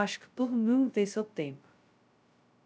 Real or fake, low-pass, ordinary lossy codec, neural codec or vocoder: fake; none; none; codec, 16 kHz, 0.2 kbps, FocalCodec